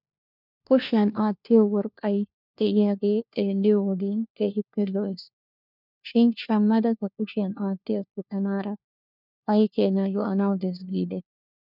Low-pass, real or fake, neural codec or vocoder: 5.4 kHz; fake; codec, 16 kHz, 1 kbps, FunCodec, trained on LibriTTS, 50 frames a second